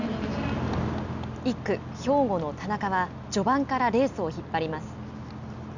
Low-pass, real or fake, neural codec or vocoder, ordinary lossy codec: 7.2 kHz; real; none; none